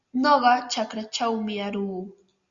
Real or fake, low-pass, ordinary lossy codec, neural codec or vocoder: real; 7.2 kHz; Opus, 64 kbps; none